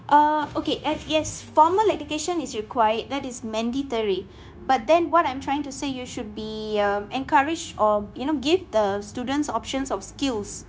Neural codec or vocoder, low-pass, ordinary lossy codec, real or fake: codec, 16 kHz, 0.9 kbps, LongCat-Audio-Codec; none; none; fake